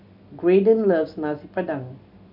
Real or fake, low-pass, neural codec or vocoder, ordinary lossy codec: real; 5.4 kHz; none; none